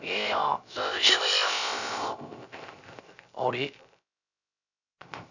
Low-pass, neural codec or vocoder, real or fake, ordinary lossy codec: 7.2 kHz; codec, 16 kHz, 0.3 kbps, FocalCodec; fake; none